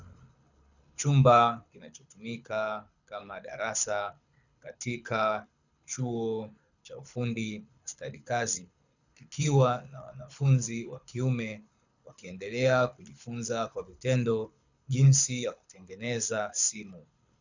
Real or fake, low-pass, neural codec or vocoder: fake; 7.2 kHz; codec, 24 kHz, 6 kbps, HILCodec